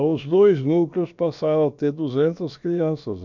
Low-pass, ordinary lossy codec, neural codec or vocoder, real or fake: 7.2 kHz; none; codec, 16 kHz, about 1 kbps, DyCAST, with the encoder's durations; fake